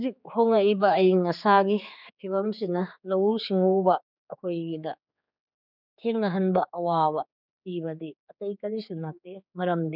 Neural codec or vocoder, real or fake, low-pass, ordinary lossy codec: codec, 16 kHz, 4 kbps, X-Codec, HuBERT features, trained on general audio; fake; 5.4 kHz; none